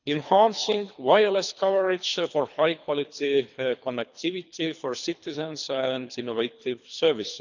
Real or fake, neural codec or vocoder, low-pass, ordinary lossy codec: fake; codec, 24 kHz, 3 kbps, HILCodec; 7.2 kHz; none